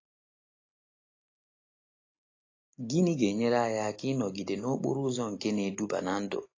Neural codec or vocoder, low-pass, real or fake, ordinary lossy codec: none; 7.2 kHz; real; AAC, 32 kbps